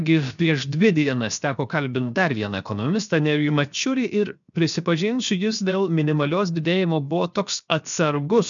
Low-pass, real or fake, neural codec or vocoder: 7.2 kHz; fake; codec, 16 kHz, 0.7 kbps, FocalCodec